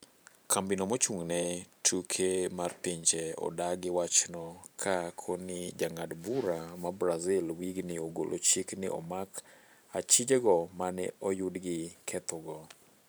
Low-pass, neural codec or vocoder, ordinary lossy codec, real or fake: none; vocoder, 44.1 kHz, 128 mel bands every 512 samples, BigVGAN v2; none; fake